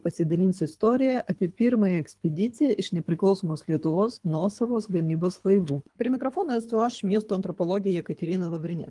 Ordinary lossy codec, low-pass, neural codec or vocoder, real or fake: Opus, 32 kbps; 10.8 kHz; codec, 24 kHz, 3 kbps, HILCodec; fake